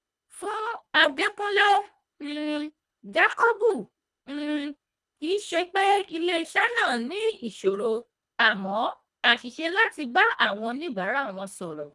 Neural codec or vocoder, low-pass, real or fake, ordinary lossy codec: codec, 24 kHz, 1.5 kbps, HILCodec; none; fake; none